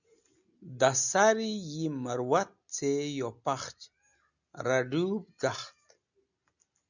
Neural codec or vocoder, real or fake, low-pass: none; real; 7.2 kHz